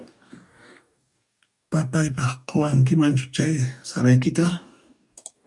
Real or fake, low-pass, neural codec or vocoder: fake; 10.8 kHz; codec, 44.1 kHz, 2.6 kbps, DAC